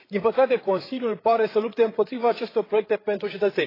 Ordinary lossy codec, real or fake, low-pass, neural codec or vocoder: AAC, 24 kbps; fake; 5.4 kHz; codec, 16 kHz, 8 kbps, FreqCodec, larger model